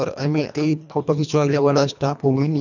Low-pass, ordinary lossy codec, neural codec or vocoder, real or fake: 7.2 kHz; none; codec, 24 kHz, 1.5 kbps, HILCodec; fake